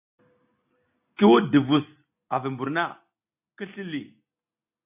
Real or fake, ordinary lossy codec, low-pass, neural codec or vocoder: real; AAC, 32 kbps; 3.6 kHz; none